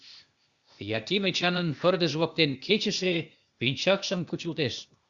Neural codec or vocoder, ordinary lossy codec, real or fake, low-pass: codec, 16 kHz, 0.8 kbps, ZipCodec; Opus, 64 kbps; fake; 7.2 kHz